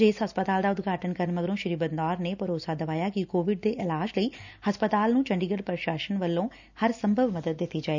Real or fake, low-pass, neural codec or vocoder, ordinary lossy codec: real; 7.2 kHz; none; none